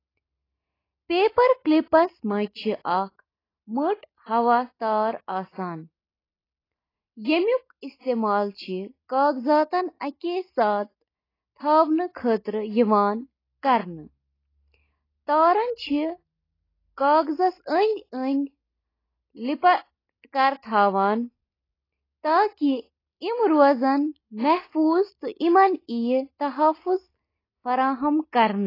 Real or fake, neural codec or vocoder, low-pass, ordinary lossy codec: real; none; 5.4 kHz; AAC, 24 kbps